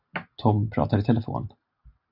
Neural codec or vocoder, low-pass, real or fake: none; 5.4 kHz; real